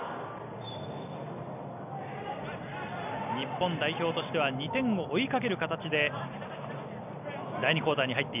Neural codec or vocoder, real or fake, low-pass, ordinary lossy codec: none; real; 3.6 kHz; none